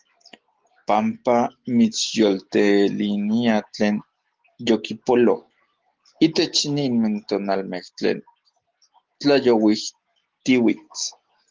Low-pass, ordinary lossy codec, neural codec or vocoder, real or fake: 7.2 kHz; Opus, 16 kbps; none; real